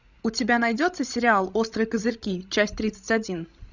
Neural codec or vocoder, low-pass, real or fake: codec, 16 kHz, 16 kbps, FreqCodec, larger model; 7.2 kHz; fake